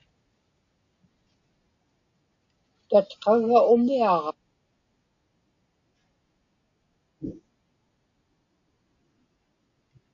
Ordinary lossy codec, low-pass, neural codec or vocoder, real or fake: AAC, 48 kbps; 7.2 kHz; none; real